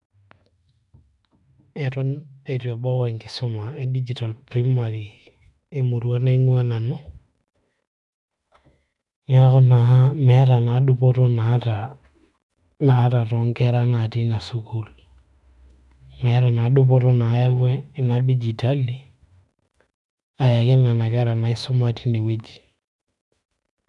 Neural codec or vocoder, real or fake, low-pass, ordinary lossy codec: autoencoder, 48 kHz, 32 numbers a frame, DAC-VAE, trained on Japanese speech; fake; 10.8 kHz; none